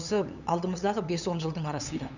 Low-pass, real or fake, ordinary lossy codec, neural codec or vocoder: 7.2 kHz; fake; none; codec, 16 kHz, 8 kbps, FunCodec, trained on LibriTTS, 25 frames a second